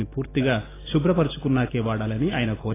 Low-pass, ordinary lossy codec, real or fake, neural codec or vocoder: 3.6 kHz; AAC, 16 kbps; real; none